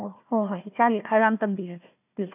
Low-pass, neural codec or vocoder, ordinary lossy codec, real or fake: 3.6 kHz; codec, 16 kHz, 1 kbps, FunCodec, trained on Chinese and English, 50 frames a second; none; fake